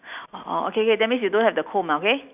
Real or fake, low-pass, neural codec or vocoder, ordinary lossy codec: real; 3.6 kHz; none; none